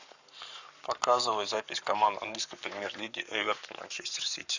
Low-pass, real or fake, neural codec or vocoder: 7.2 kHz; fake; codec, 44.1 kHz, 7.8 kbps, Pupu-Codec